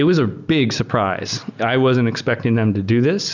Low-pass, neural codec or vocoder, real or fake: 7.2 kHz; none; real